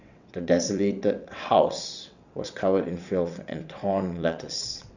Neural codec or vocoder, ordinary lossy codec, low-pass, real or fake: vocoder, 22.05 kHz, 80 mel bands, WaveNeXt; none; 7.2 kHz; fake